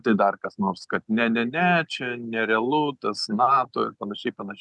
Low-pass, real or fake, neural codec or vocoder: 10.8 kHz; real; none